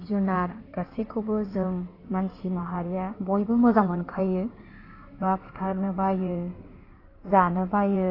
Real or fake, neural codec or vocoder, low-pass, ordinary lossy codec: fake; codec, 16 kHz in and 24 kHz out, 2.2 kbps, FireRedTTS-2 codec; 5.4 kHz; AAC, 24 kbps